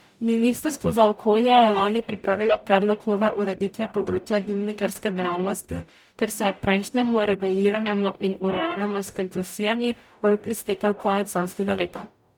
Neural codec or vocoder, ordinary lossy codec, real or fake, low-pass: codec, 44.1 kHz, 0.9 kbps, DAC; none; fake; none